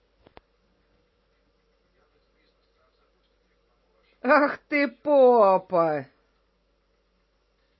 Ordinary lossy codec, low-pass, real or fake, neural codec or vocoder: MP3, 24 kbps; 7.2 kHz; real; none